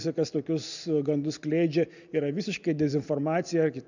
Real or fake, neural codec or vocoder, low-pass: real; none; 7.2 kHz